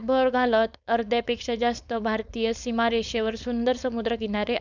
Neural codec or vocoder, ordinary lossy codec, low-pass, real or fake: codec, 16 kHz, 4.8 kbps, FACodec; none; 7.2 kHz; fake